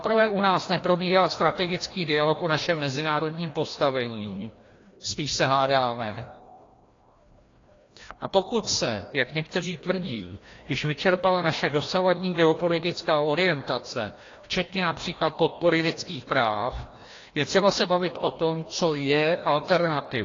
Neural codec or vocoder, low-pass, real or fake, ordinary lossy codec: codec, 16 kHz, 1 kbps, FreqCodec, larger model; 7.2 kHz; fake; AAC, 32 kbps